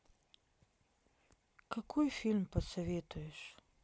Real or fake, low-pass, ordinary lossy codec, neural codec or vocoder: real; none; none; none